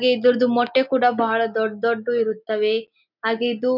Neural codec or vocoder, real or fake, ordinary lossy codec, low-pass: none; real; none; 5.4 kHz